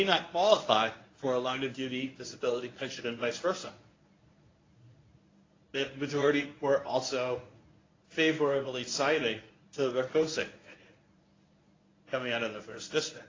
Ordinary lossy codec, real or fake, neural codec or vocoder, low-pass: AAC, 32 kbps; fake; codec, 24 kHz, 0.9 kbps, WavTokenizer, medium speech release version 1; 7.2 kHz